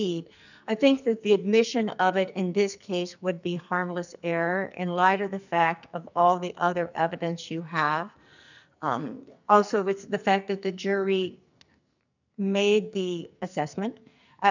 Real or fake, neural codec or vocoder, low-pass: fake; codec, 44.1 kHz, 2.6 kbps, SNAC; 7.2 kHz